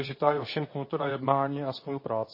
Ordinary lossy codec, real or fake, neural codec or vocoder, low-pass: MP3, 24 kbps; fake; codec, 24 kHz, 0.9 kbps, WavTokenizer, medium speech release version 2; 5.4 kHz